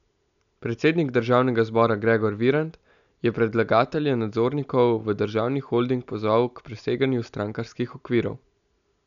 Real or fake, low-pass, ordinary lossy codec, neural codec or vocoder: real; 7.2 kHz; none; none